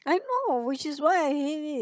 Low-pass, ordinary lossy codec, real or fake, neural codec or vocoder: none; none; fake; codec, 16 kHz, 16 kbps, FunCodec, trained on Chinese and English, 50 frames a second